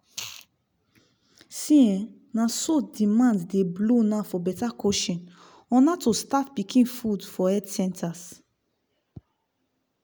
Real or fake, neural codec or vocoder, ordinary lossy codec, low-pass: real; none; none; none